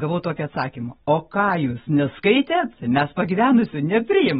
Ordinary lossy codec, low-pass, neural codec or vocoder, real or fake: AAC, 16 kbps; 19.8 kHz; none; real